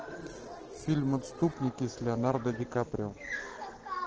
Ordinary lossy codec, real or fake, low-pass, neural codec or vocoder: Opus, 16 kbps; real; 7.2 kHz; none